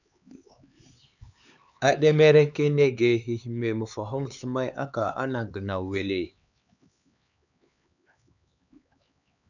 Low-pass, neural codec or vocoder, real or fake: 7.2 kHz; codec, 16 kHz, 4 kbps, X-Codec, HuBERT features, trained on LibriSpeech; fake